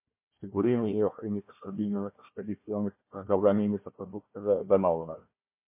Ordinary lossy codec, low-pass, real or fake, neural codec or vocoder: MP3, 24 kbps; 3.6 kHz; fake; codec, 16 kHz, 1 kbps, FunCodec, trained on Chinese and English, 50 frames a second